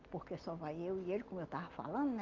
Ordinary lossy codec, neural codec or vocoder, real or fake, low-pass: Opus, 32 kbps; none; real; 7.2 kHz